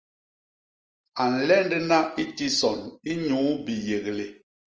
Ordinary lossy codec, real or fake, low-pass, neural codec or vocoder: Opus, 24 kbps; real; 7.2 kHz; none